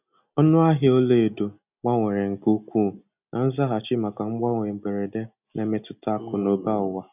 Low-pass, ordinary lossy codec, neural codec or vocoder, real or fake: 3.6 kHz; none; none; real